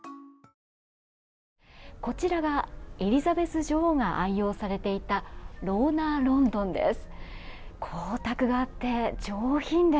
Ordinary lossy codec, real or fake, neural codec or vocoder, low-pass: none; real; none; none